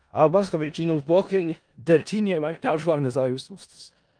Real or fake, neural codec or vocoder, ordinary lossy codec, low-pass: fake; codec, 16 kHz in and 24 kHz out, 0.4 kbps, LongCat-Audio-Codec, four codebook decoder; Opus, 32 kbps; 9.9 kHz